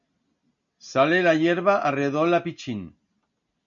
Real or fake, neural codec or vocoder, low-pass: real; none; 7.2 kHz